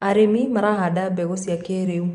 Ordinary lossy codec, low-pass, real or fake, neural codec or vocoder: none; 10.8 kHz; fake; vocoder, 24 kHz, 100 mel bands, Vocos